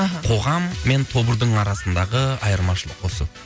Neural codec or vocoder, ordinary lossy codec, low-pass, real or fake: none; none; none; real